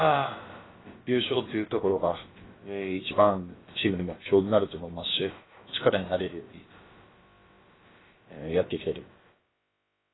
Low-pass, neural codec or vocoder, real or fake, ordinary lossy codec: 7.2 kHz; codec, 16 kHz, about 1 kbps, DyCAST, with the encoder's durations; fake; AAC, 16 kbps